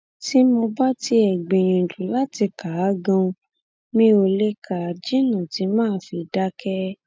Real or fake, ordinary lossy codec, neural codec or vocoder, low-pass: real; none; none; none